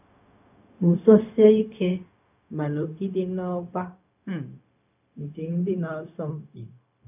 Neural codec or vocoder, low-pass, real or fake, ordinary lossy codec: codec, 16 kHz, 0.4 kbps, LongCat-Audio-Codec; 3.6 kHz; fake; none